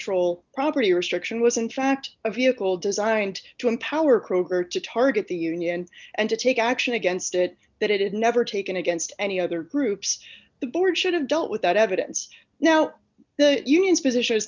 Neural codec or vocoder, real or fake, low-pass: none; real; 7.2 kHz